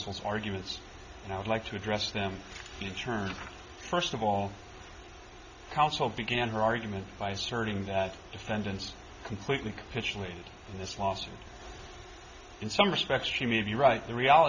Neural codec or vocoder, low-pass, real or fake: none; 7.2 kHz; real